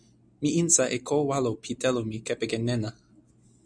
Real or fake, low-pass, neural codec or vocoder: real; 9.9 kHz; none